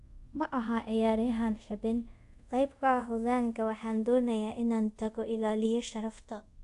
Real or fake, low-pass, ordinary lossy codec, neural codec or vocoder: fake; 10.8 kHz; AAC, 64 kbps; codec, 24 kHz, 0.5 kbps, DualCodec